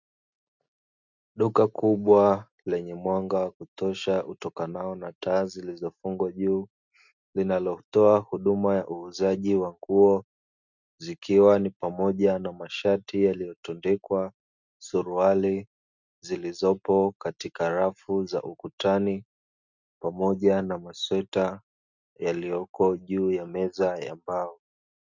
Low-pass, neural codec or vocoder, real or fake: 7.2 kHz; none; real